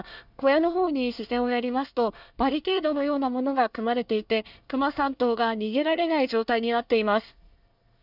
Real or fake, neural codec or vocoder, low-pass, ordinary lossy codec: fake; codec, 24 kHz, 1 kbps, SNAC; 5.4 kHz; none